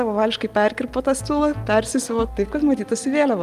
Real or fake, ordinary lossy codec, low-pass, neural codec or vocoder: real; Opus, 32 kbps; 14.4 kHz; none